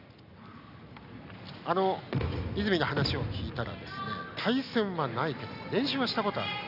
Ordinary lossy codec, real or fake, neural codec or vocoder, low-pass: none; real; none; 5.4 kHz